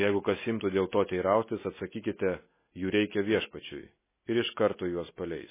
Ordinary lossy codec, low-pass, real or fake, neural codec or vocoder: MP3, 16 kbps; 3.6 kHz; real; none